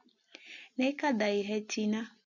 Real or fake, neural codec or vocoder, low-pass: real; none; 7.2 kHz